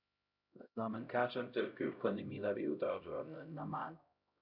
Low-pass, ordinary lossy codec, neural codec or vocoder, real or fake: 5.4 kHz; none; codec, 16 kHz, 0.5 kbps, X-Codec, HuBERT features, trained on LibriSpeech; fake